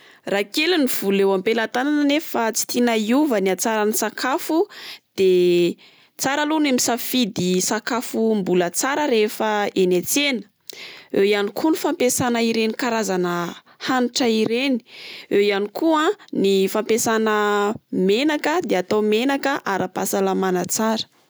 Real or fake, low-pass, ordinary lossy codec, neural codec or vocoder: real; none; none; none